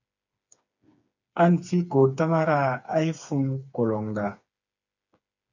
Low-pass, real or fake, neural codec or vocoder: 7.2 kHz; fake; codec, 16 kHz, 4 kbps, FreqCodec, smaller model